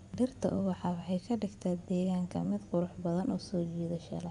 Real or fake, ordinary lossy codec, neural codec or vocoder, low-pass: fake; none; vocoder, 24 kHz, 100 mel bands, Vocos; 10.8 kHz